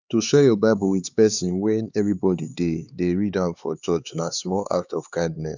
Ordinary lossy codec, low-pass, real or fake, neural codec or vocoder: none; 7.2 kHz; fake; codec, 16 kHz, 4 kbps, X-Codec, HuBERT features, trained on LibriSpeech